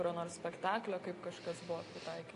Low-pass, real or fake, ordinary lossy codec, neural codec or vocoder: 10.8 kHz; real; AAC, 48 kbps; none